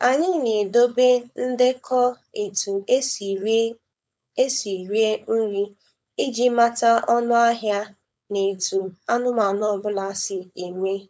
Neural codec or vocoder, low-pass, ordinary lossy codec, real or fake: codec, 16 kHz, 4.8 kbps, FACodec; none; none; fake